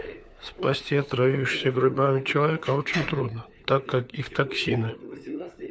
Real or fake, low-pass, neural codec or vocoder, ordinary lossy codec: fake; none; codec, 16 kHz, 4 kbps, FunCodec, trained on LibriTTS, 50 frames a second; none